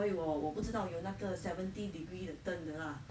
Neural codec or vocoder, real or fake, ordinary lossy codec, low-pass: none; real; none; none